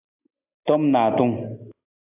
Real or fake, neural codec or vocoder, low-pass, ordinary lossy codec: real; none; 3.6 kHz; AAC, 32 kbps